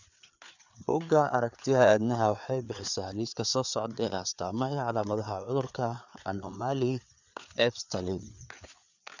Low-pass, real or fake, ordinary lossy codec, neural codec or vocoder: 7.2 kHz; fake; none; codec, 16 kHz, 4 kbps, FreqCodec, larger model